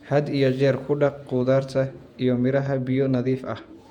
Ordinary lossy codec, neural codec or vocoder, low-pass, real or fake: MP3, 96 kbps; vocoder, 48 kHz, 128 mel bands, Vocos; 19.8 kHz; fake